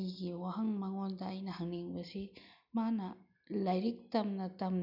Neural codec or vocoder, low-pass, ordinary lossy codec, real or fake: none; 5.4 kHz; none; real